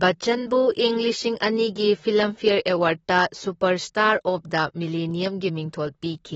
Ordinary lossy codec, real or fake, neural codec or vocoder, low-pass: AAC, 24 kbps; fake; vocoder, 44.1 kHz, 128 mel bands, Pupu-Vocoder; 19.8 kHz